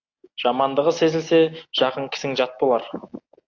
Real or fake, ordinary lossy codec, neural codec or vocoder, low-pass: real; AAC, 48 kbps; none; 7.2 kHz